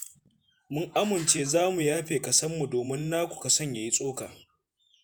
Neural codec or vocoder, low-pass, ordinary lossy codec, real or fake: vocoder, 48 kHz, 128 mel bands, Vocos; none; none; fake